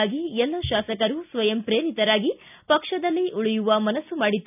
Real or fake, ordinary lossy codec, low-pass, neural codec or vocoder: real; none; 3.6 kHz; none